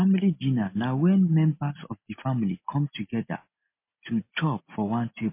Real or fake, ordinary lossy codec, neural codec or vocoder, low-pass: real; MP3, 24 kbps; none; 3.6 kHz